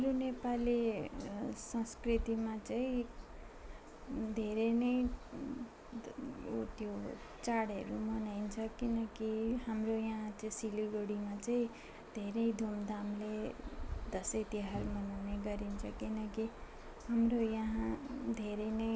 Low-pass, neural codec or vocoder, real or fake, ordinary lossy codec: none; none; real; none